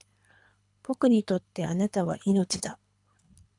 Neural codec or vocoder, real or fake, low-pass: codec, 24 kHz, 3 kbps, HILCodec; fake; 10.8 kHz